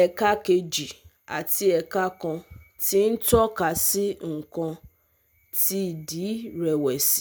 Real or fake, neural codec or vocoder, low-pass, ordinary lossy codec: real; none; none; none